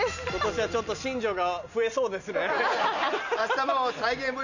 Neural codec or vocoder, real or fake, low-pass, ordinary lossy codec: none; real; 7.2 kHz; none